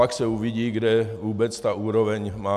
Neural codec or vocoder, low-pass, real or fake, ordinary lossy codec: none; 14.4 kHz; real; AAC, 96 kbps